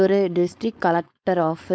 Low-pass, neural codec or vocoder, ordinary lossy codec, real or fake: none; codec, 16 kHz, 4.8 kbps, FACodec; none; fake